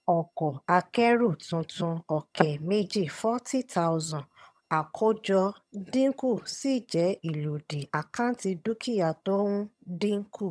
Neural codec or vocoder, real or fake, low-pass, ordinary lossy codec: vocoder, 22.05 kHz, 80 mel bands, HiFi-GAN; fake; none; none